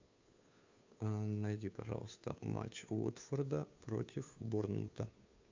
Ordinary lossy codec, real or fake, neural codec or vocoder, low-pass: MP3, 64 kbps; fake; codec, 16 kHz, 2 kbps, FunCodec, trained on Chinese and English, 25 frames a second; 7.2 kHz